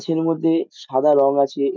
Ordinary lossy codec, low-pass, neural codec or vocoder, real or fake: none; none; none; real